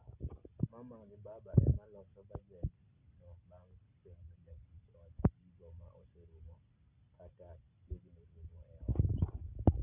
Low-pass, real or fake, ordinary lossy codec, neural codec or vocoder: 3.6 kHz; real; none; none